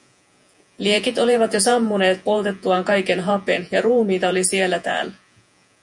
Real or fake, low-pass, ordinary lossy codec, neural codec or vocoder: fake; 10.8 kHz; MP3, 96 kbps; vocoder, 48 kHz, 128 mel bands, Vocos